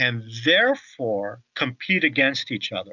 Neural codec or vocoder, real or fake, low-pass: none; real; 7.2 kHz